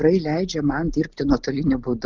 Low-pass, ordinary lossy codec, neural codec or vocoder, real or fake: 7.2 kHz; Opus, 32 kbps; none; real